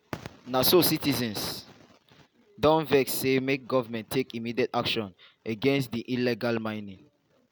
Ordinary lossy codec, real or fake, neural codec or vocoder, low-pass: none; real; none; none